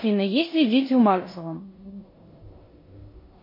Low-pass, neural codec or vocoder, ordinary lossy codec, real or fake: 5.4 kHz; codec, 16 kHz in and 24 kHz out, 0.9 kbps, LongCat-Audio-Codec, four codebook decoder; MP3, 24 kbps; fake